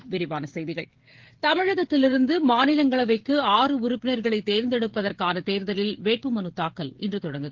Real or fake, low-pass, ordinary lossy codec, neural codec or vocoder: fake; 7.2 kHz; Opus, 16 kbps; codec, 16 kHz, 16 kbps, FreqCodec, smaller model